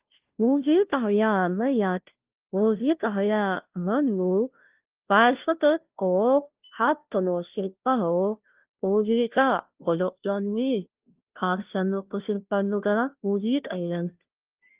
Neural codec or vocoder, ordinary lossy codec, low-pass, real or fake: codec, 16 kHz, 0.5 kbps, FunCodec, trained on Chinese and English, 25 frames a second; Opus, 32 kbps; 3.6 kHz; fake